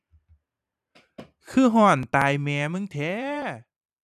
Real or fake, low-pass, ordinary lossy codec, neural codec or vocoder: fake; 14.4 kHz; none; vocoder, 44.1 kHz, 128 mel bands every 512 samples, BigVGAN v2